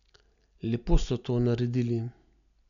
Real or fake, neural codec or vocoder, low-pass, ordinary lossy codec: real; none; 7.2 kHz; none